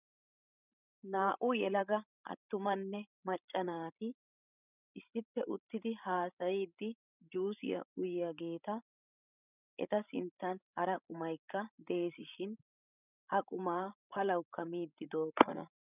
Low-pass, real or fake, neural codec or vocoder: 3.6 kHz; fake; codec, 16 kHz, 16 kbps, FreqCodec, larger model